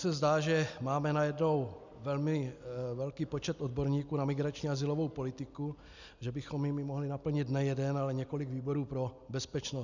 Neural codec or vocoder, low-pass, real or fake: none; 7.2 kHz; real